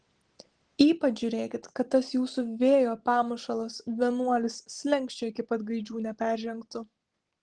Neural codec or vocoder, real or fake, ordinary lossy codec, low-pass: none; real; Opus, 16 kbps; 9.9 kHz